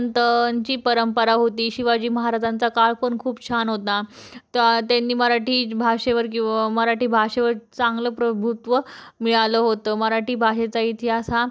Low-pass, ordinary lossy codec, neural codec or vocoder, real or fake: none; none; none; real